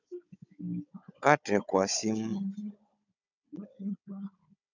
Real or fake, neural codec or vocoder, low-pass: fake; codec, 16 kHz, 16 kbps, FunCodec, trained on Chinese and English, 50 frames a second; 7.2 kHz